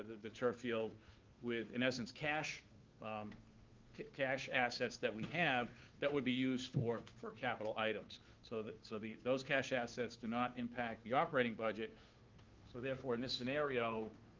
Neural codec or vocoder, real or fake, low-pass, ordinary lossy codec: codec, 16 kHz, 2 kbps, FunCodec, trained on Chinese and English, 25 frames a second; fake; 7.2 kHz; Opus, 32 kbps